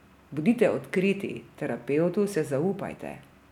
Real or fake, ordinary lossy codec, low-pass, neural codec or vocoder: real; none; 19.8 kHz; none